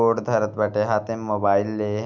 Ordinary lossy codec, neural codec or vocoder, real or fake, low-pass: none; none; real; 7.2 kHz